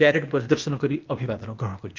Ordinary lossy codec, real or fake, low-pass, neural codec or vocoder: Opus, 24 kbps; fake; 7.2 kHz; codec, 16 kHz, 0.8 kbps, ZipCodec